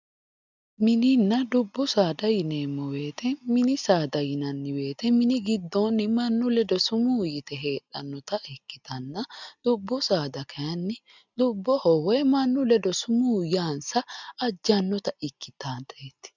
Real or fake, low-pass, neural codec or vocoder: real; 7.2 kHz; none